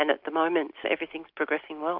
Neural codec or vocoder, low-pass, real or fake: none; 5.4 kHz; real